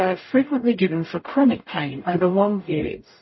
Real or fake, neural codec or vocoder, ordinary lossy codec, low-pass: fake; codec, 44.1 kHz, 0.9 kbps, DAC; MP3, 24 kbps; 7.2 kHz